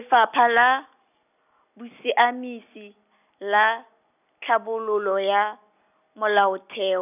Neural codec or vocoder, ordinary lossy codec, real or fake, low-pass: none; none; real; 3.6 kHz